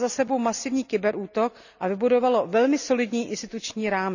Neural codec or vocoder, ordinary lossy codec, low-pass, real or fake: none; none; 7.2 kHz; real